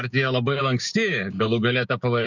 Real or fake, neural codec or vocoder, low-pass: fake; codec, 16 kHz, 6 kbps, DAC; 7.2 kHz